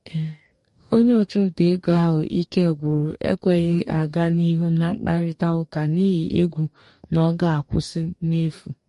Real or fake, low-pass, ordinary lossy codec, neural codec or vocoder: fake; 14.4 kHz; MP3, 48 kbps; codec, 44.1 kHz, 2.6 kbps, DAC